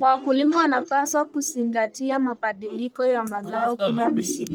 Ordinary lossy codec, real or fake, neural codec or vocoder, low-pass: none; fake; codec, 44.1 kHz, 1.7 kbps, Pupu-Codec; none